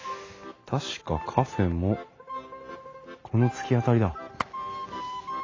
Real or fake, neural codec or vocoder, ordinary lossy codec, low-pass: real; none; AAC, 32 kbps; 7.2 kHz